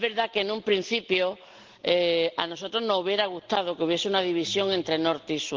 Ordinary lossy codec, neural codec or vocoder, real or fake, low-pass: Opus, 16 kbps; none; real; 7.2 kHz